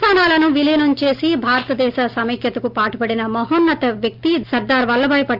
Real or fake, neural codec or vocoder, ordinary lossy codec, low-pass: real; none; Opus, 32 kbps; 5.4 kHz